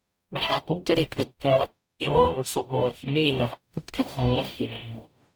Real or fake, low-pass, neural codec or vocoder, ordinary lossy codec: fake; none; codec, 44.1 kHz, 0.9 kbps, DAC; none